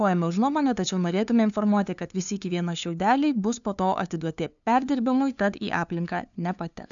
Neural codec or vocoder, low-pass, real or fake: codec, 16 kHz, 2 kbps, FunCodec, trained on LibriTTS, 25 frames a second; 7.2 kHz; fake